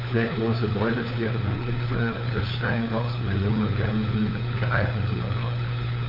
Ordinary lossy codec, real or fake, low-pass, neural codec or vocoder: MP3, 48 kbps; fake; 5.4 kHz; codec, 16 kHz, 4 kbps, FunCodec, trained on LibriTTS, 50 frames a second